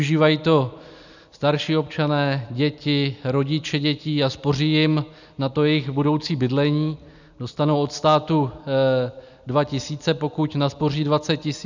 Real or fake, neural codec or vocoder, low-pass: real; none; 7.2 kHz